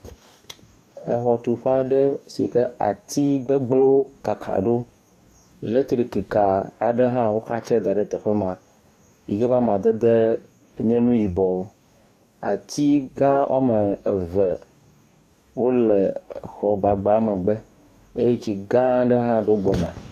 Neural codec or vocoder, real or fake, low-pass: codec, 44.1 kHz, 2.6 kbps, DAC; fake; 14.4 kHz